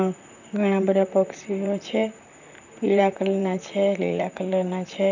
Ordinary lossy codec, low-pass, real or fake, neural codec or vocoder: none; 7.2 kHz; fake; vocoder, 44.1 kHz, 128 mel bands, Pupu-Vocoder